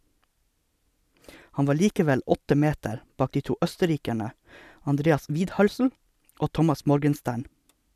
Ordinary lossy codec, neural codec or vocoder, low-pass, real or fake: none; none; 14.4 kHz; real